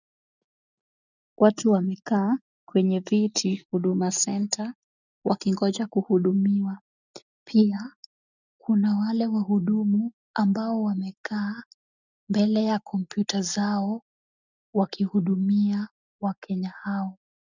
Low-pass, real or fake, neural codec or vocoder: 7.2 kHz; real; none